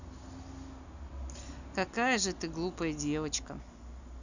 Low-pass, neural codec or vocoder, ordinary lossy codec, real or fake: 7.2 kHz; none; none; real